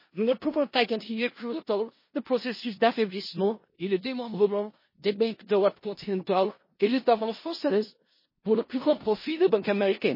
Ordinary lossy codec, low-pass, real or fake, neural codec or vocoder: MP3, 24 kbps; 5.4 kHz; fake; codec, 16 kHz in and 24 kHz out, 0.4 kbps, LongCat-Audio-Codec, four codebook decoder